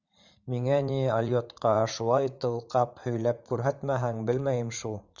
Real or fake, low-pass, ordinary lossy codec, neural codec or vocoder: fake; 7.2 kHz; Opus, 64 kbps; vocoder, 44.1 kHz, 80 mel bands, Vocos